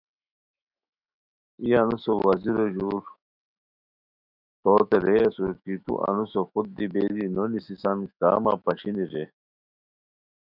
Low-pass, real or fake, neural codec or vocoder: 5.4 kHz; fake; autoencoder, 48 kHz, 128 numbers a frame, DAC-VAE, trained on Japanese speech